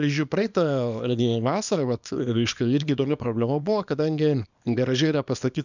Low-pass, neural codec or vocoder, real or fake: 7.2 kHz; codec, 24 kHz, 0.9 kbps, WavTokenizer, small release; fake